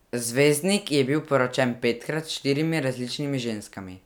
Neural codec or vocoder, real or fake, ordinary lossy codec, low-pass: none; real; none; none